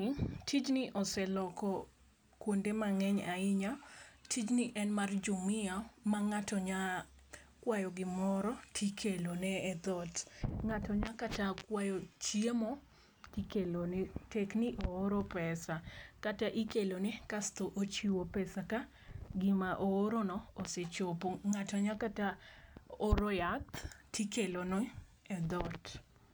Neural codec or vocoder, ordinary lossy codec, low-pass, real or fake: none; none; none; real